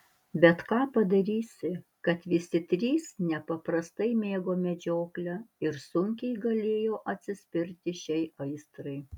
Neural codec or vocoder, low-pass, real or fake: none; 19.8 kHz; real